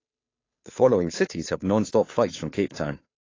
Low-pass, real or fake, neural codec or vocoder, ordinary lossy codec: 7.2 kHz; fake; codec, 16 kHz, 2 kbps, FunCodec, trained on Chinese and English, 25 frames a second; AAC, 32 kbps